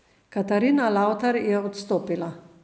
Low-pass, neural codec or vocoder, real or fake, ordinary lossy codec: none; none; real; none